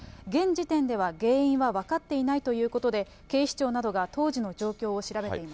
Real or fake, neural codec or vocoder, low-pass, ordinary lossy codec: real; none; none; none